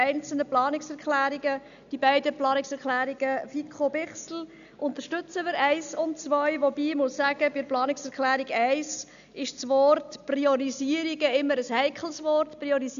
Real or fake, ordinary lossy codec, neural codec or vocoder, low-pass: real; none; none; 7.2 kHz